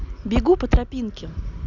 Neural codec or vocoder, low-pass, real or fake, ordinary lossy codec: none; 7.2 kHz; real; none